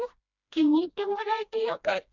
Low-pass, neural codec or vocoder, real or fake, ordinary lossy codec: 7.2 kHz; codec, 16 kHz, 1 kbps, FreqCodec, smaller model; fake; none